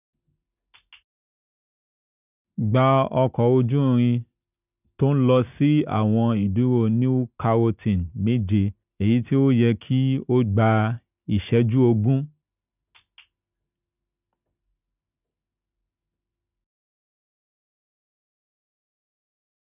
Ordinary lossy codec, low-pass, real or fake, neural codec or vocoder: none; 3.6 kHz; real; none